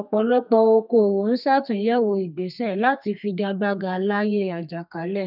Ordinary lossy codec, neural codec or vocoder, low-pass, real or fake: none; codec, 32 kHz, 1.9 kbps, SNAC; 5.4 kHz; fake